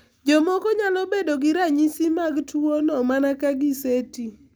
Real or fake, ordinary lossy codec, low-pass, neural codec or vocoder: real; none; none; none